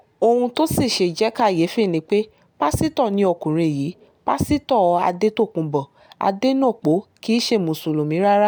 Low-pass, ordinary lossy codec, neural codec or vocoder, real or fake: none; none; none; real